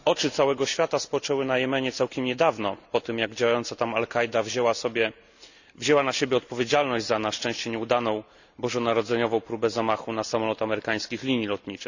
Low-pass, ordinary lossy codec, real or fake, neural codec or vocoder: 7.2 kHz; none; real; none